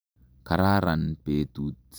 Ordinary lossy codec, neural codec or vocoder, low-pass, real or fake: none; vocoder, 44.1 kHz, 128 mel bands every 512 samples, BigVGAN v2; none; fake